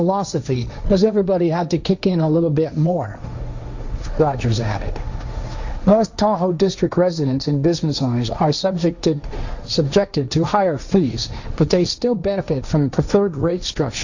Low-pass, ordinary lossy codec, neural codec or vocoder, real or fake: 7.2 kHz; Opus, 64 kbps; codec, 16 kHz, 1.1 kbps, Voila-Tokenizer; fake